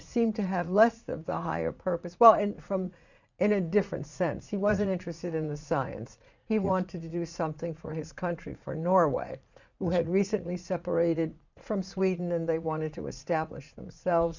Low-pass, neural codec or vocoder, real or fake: 7.2 kHz; vocoder, 44.1 kHz, 80 mel bands, Vocos; fake